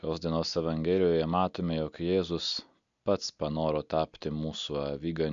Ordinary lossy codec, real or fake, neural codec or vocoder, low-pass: MP3, 64 kbps; real; none; 7.2 kHz